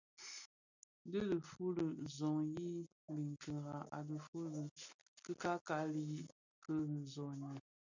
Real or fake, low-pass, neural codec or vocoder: real; 7.2 kHz; none